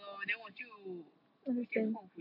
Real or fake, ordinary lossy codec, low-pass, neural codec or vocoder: real; AAC, 32 kbps; 5.4 kHz; none